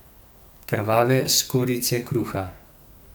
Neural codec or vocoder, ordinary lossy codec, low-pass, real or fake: codec, 44.1 kHz, 2.6 kbps, SNAC; none; none; fake